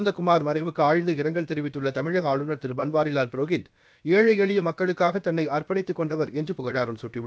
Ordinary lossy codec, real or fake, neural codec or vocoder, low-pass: none; fake; codec, 16 kHz, about 1 kbps, DyCAST, with the encoder's durations; none